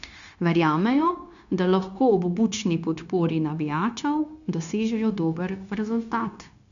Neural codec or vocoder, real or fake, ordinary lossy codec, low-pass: codec, 16 kHz, 0.9 kbps, LongCat-Audio-Codec; fake; none; 7.2 kHz